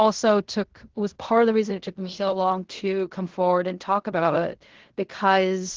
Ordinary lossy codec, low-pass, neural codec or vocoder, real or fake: Opus, 16 kbps; 7.2 kHz; codec, 16 kHz in and 24 kHz out, 0.4 kbps, LongCat-Audio-Codec, fine tuned four codebook decoder; fake